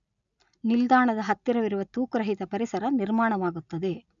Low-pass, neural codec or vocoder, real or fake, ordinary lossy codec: 7.2 kHz; none; real; none